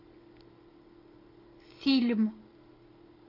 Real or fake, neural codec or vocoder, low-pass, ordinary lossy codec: real; none; 5.4 kHz; AAC, 24 kbps